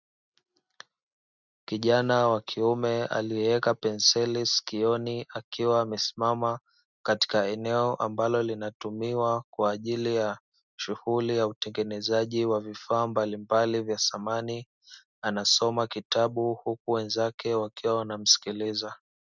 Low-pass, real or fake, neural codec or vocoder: 7.2 kHz; real; none